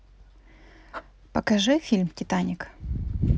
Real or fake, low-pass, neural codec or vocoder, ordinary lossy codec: real; none; none; none